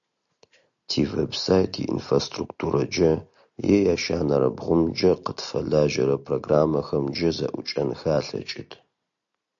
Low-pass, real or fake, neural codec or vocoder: 7.2 kHz; real; none